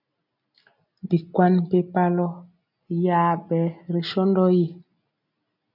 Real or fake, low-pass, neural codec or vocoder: real; 5.4 kHz; none